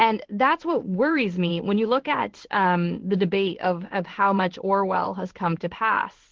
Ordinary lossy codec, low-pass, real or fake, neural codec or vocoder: Opus, 16 kbps; 7.2 kHz; fake; codec, 16 kHz in and 24 kHz out, 1 kbps, XY-Tokenizer